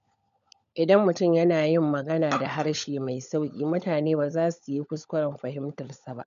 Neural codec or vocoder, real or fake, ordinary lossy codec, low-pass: codec, 16 kHz, 16 kbps, FunCodec, trained on LibriTTS, 50 frames a second; fake; none; 7.2 kHz